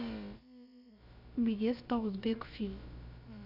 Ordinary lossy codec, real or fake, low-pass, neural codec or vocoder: none; fake; 5.4 kHz; codec, 16 kHz, about 1 kbps, DyCAST, with the encoder's durations